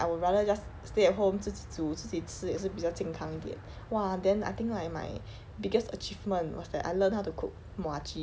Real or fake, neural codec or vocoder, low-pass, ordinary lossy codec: real; none; none; none